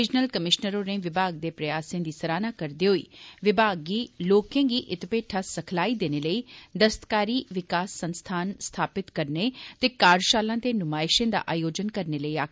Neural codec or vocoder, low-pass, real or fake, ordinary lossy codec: none; none; real; none